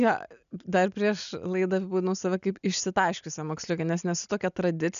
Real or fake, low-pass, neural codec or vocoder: real; 7.2 kHz; none